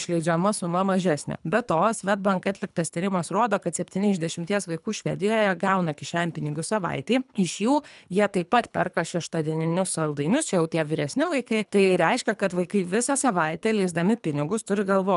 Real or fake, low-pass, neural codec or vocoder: fake; 10.8 kHz; codec, 24 kHz, 3 kbps, HILCodec